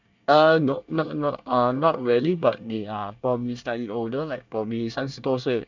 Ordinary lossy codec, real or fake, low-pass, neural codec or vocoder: none; fake; 7.2 kHz; codec, 24 kHz, 1 kbps, SNAC